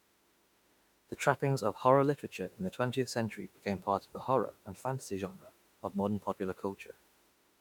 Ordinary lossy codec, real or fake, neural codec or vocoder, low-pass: MP3, 96 kbps; fake; autoencoder, 48 kHz, 32 numbers a frame, DAC-VAE, trained on Japanese speech; 19.8 kHz